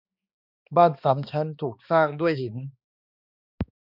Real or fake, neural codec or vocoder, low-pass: fake; codec, 16 kHz, 2 kbps, X-Codec, HuBERT features, trained on balanced general audio; 5.4 kHz